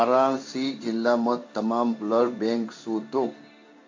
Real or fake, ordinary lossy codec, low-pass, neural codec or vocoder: fake; MP3, 48 kbps; 7.2 kHz; codec, 16 kHz in and 24 kHz out, 1 kbps, XY-Tokenizer